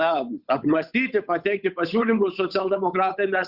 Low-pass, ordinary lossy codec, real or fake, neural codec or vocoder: 5.4 kHz; Opus, 64 kbps; fake; codec, 16 kHz, 8 kbps, FunCodec, trained on Chinese and English, 25 frames a second